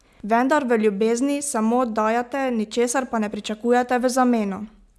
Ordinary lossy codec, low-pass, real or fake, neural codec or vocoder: none; none; real; none